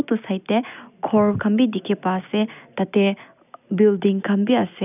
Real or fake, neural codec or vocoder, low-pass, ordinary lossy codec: real; none; 3.6 kHz; none